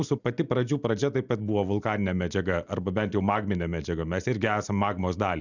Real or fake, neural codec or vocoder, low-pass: real; none; 7.2 kHz